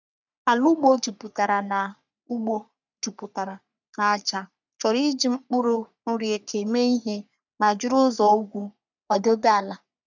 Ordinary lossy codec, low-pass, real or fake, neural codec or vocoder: none; 7.2 kHz; fake; codec, 44.1 kHz, 3.4 kbps, Pupu-Codec